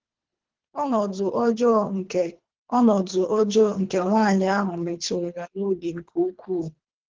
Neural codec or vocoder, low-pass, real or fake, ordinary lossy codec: codec, 24 kHz, 3 kbps, HILCodec; 7.2 kHz; fake; Opus, 16 kbps